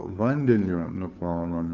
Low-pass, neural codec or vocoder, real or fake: 7.2 kHz; codec, 16 kHz, 2 kbps, FunCodec, trained on Chinese and English, 25 frames a second; fake